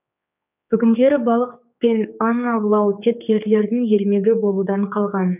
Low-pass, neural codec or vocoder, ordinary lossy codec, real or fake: 3.6 kHz; codec, 16 kHz, 4 kbps, X-Codec, HuBERT features, trained on general audio; none; fake